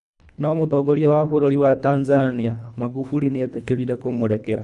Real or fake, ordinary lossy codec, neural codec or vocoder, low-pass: fake; none; codec, 24 kHz, 1.5 kbps, HILCodec; 10.8 kHz